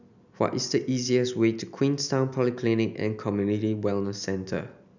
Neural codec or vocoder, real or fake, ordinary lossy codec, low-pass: none; real; none; 7.2 kHz